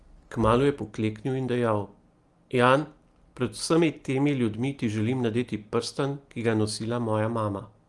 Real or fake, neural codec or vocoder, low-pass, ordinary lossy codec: real; none; 10.8 kHz; Opus, 24 kbps